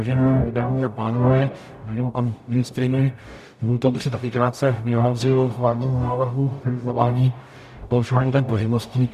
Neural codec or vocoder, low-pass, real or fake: codec, 44.1 kHz, 0.9 kbps, DAC; 14.4 kHz; fake